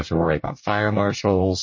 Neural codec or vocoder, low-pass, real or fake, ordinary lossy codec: codec, 24 kHz, 1 kbps, SNAC; 7.2 kHz; fake; MP3, 32 kbps